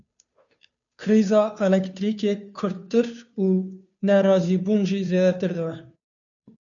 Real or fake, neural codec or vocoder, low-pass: fake; codec, 16 kHz, 2 kbps, FunCodec, trained on Chinese and English, 25 frames a second; 7.2 kHz